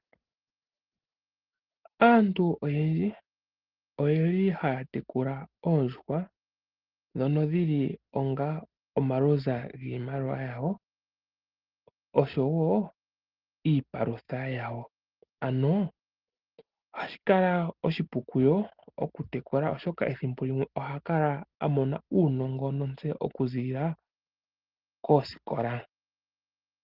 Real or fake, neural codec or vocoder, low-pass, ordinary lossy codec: real; none; 5.4 kHz; Opus, 16 kbps